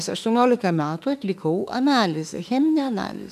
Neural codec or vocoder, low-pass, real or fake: autoencoder, 48 kHz, 32 numbers a frame, DAC-VAE, trained on Japanese speech; 14.4 kHz; fake